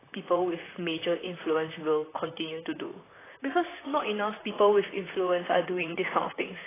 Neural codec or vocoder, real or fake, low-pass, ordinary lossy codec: vocoder, 44.1 kHz, 128 mel bands, Pupu-Vocoder; fake; 3.6 kHz; AAC, 16 kbps